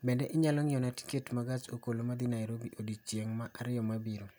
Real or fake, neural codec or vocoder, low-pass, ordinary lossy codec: real; none; none; none